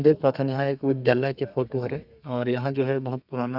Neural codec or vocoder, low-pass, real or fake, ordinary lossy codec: codec, 32 kHz, 1.9 kbps, SNAC; 5.4 kHz; fake; none